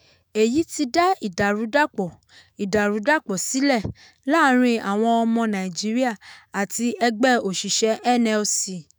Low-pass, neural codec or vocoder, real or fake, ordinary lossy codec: none; autoencoder, 48 kHz, 128 numbers a frame, DAC-VAE, trained on Japanese speech; fake; none